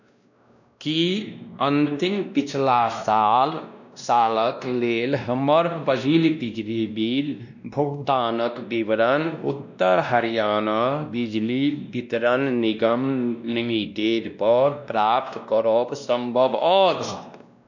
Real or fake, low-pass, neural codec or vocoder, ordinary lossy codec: fake; 7.2 kHz; codec, 16 kHz, 1 kbps, X-Codec, WavLM features, trained on Multilingual LibriSpeech; none